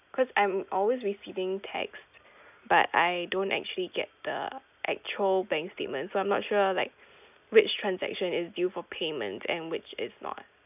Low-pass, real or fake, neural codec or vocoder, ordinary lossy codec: 3.6 kHz; real; none; none